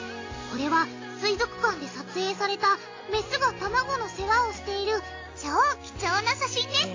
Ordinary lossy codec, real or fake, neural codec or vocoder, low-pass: AAC, 32 kbps; real; none; 7.2 kHz